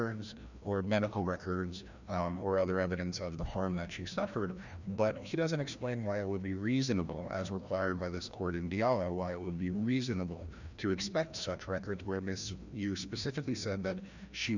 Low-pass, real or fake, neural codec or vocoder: 7.2 kHz; fake; codec, 16 kHz, 1 kbps, FreqCodec, larger model